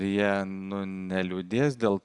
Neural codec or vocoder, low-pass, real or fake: none; 10.8 kHz; real